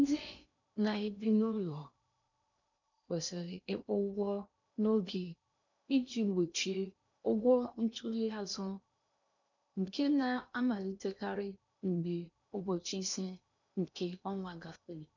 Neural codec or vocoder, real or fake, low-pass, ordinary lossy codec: codec, 16 kHz in and 24 kHz out, 0.8 kbps, FocalCodec, streaming, 65536 codes; fake; 7.2 kHz; none